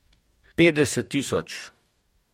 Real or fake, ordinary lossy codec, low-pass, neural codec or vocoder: fake; MP3, 64 kbps; 19.8 kHz; codec, 44.1 kHz, 2.6 kbps, DAC